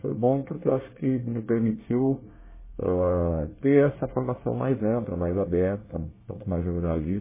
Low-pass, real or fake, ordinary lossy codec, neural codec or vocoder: 3.6 kHz; fake; MP3, 16 kbps; codec, 24 kHz, 1 kbps, SNAC